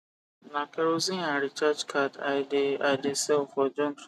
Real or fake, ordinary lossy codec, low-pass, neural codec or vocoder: real; none; 14.4 kHz; none